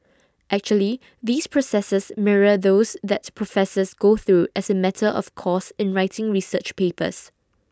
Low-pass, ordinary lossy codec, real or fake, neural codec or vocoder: none; none; real; none